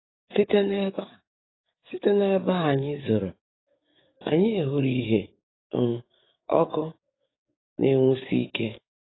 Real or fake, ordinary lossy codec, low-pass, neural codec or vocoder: fake; AAC, 16 kbps; 7.2 kHz; codec, 44.1 kHz, 7.8 kbps, DAC